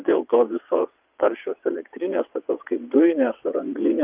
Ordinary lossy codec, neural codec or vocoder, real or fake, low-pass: Opus, 32 kbps; vocoder, 22.05 kHz, 80 mel bands, WaveNeXt; fake; 3.6 kHz